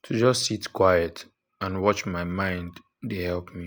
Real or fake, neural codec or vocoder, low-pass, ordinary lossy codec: real; none; none; none